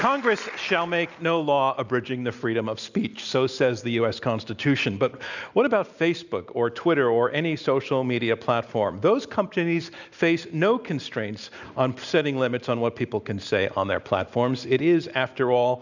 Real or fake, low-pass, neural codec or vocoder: real; 7.2 kHz; none